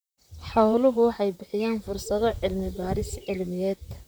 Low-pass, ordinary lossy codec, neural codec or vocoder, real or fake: none; none; vocoder, 44.1 kHz, 128 mel bands, Pupu-Vocoder; fake